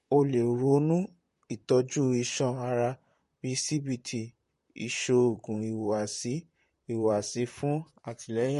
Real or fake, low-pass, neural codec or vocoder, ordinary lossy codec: fake; 14.4 kHz; vocoder, 44.1 kHz, 128 mel bands, Pupu-Vocoder; MP3, 48 kbps